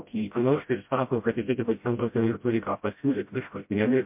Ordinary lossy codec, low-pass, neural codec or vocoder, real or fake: MP3, 32 kbps; 3.6 kHz; codec, 16 kHz, 0.5 kbps, FreqCodec, smaller model; fake